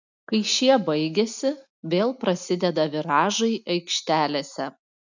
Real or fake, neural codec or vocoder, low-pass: real; none; 7.2 kHz